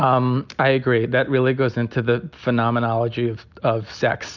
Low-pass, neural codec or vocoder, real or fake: 7.2 kHz; none; real